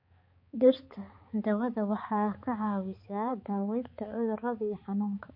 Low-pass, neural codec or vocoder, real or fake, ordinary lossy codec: 5.4 kHz; codec, 16 kHz, 4 kbps, X-Codec, HuBERT features, trained on balanced general audio; fake; MP3, 32 kbps